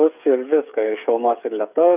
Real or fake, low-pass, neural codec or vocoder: fake; 3.6 kHz; codec, 16 kHz, 8 kbps, FreqCodec, smaller model